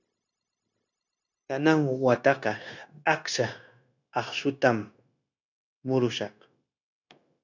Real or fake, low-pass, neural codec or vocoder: fake; 7.2 kHz; codec, 16 kHz, 0.9 kbps, LongCat-Audio-Codec